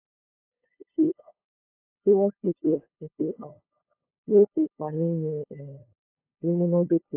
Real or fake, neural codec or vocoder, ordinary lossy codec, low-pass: fake; codec, 16 kHz, 2 kbps, FunCodec, trained on LibriTTS, 25 frames a second; AAC, 24 kbps; 3.6 kHz